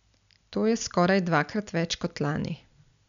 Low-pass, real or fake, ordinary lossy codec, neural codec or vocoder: 7.2 kHz; real; none; none